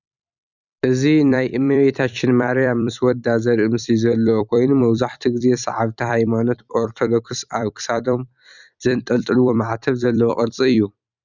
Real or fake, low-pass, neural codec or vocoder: fake; 7.2 kHz; vocoder, 44.1 kHz, 128 mel bands every 256 samples, BigVGAN v2